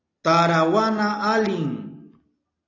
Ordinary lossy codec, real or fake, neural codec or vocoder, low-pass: AAC, 32 kbps; real; none; 7.2 kHz